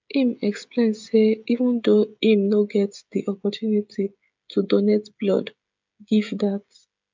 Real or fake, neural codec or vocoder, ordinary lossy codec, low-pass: fake; codec, 16 kHz, 16 kbps, FreqCodec, smaller model; MP3, 64 kbps; 7.2 kHz